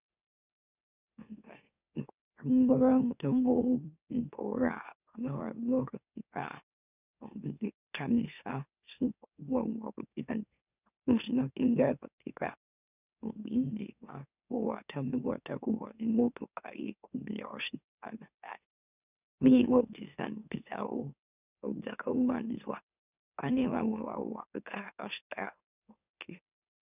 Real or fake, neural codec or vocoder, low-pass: fake; autoencoder, 44.1 kHz, a latent of 192 numbers a frame, MeloTTS; 3.6 kHz